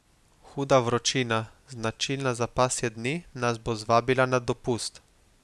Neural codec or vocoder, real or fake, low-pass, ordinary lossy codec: none; real; none; none